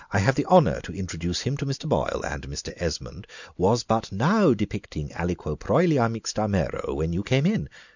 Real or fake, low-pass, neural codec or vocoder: real; 7.2 kHz; none